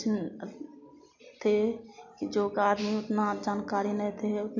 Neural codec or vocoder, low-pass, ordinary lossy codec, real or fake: none; 7.2 kHz; none; real